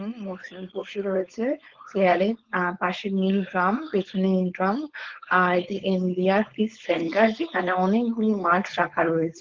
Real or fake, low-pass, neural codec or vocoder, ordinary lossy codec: fake; 7.2 kHz; codec, 16 kHz, 4.8 kbps, FACodec; Opus, 16 kbps